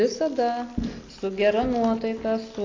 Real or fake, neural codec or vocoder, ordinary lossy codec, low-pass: real; none; AAC, 32 kbps; 7.2 kHz